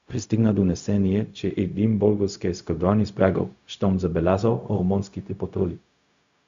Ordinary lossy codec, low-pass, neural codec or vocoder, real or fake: Opus, 64 kbps; 7.2 kHz; codec, 16 kHz, 0.4 kbps, LongCat-Audio-Codec; fake